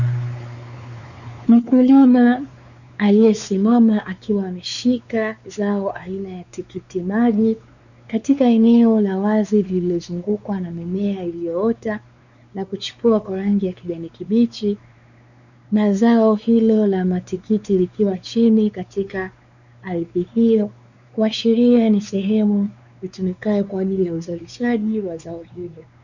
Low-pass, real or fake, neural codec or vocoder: 7.2 kHz; fake; codec, 16 kHz, 4 kbps, X-Codec, WavLM features, trained on Multilingual LibriSpeech